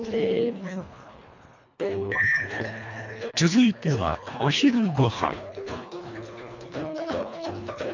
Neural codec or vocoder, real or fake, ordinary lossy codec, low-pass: codec, 24 kHz, 1.5 kbps, HILCodec; fake; MP3, 48 kbps; 7.2 kHz